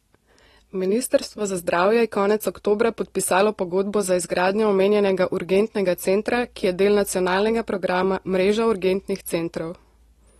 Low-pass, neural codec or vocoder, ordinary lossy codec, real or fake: 19.8 kHz; none; AAC, 32 kbps; real